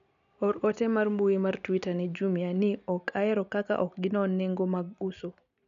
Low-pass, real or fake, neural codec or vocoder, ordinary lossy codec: 7.2 kHz; real; none; none